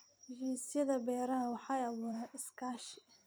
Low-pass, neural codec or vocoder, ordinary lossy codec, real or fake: none; vocoder, 44.1 kHz, 128 mel bands every 256 samples, BigVGAN v2; none; fake